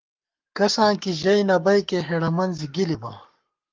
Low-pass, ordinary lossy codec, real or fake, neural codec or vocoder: 7.2 kHz; Opus, 24 kbps; fake; codec, 44.1 kHz, 7.8 kbps, Pupu-Codec